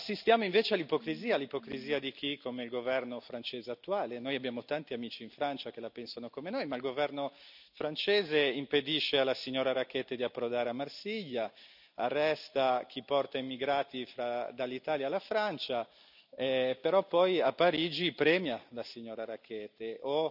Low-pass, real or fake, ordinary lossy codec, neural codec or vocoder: 5.4 kHz; real; none; none